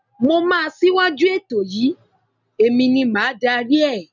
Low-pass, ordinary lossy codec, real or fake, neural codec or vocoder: 7.2 kHz; none; real; none